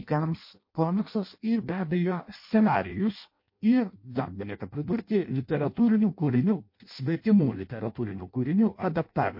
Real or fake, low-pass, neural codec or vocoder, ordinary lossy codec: fake; 5.4 kHz; codec, 16 kHz in and 24 kHz out, 0.6 kbps, FireRedTTS-2 codec; MP3, 32 kbps